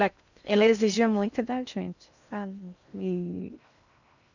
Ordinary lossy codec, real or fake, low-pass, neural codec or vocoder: none; fake; 7.2 kHz; codec, 16 kHz in and 24 kHz out, 0.6 kbps, FocalCodec, streaming, 2048 codes